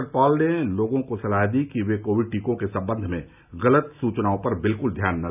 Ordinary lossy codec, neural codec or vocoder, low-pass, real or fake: none; none; 3.6 kHz; real